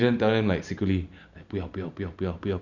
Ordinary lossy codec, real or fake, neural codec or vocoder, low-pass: none; real; none; 7.2 kHz